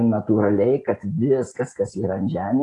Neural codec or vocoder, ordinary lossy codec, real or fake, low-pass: none; AAC, 32 kbps; real; 10.8 kHz